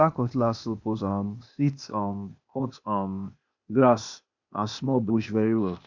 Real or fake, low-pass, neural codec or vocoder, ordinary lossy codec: fake; 7.2 kHz; codec, 16 kHz, 0.8 kbps, ZipCodec; none